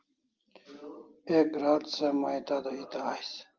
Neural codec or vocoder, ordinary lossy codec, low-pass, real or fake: none; Opus, 32 kbps; 7.2 kHz; real